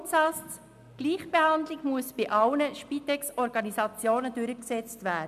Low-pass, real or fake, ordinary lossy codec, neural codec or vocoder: 14.4 kHz; real; MP3, 96 kbps; none